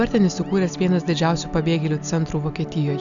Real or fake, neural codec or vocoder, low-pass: real; none; 7.2 kHz